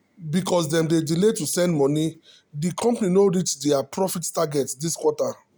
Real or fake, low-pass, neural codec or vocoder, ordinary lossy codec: real; none; none; none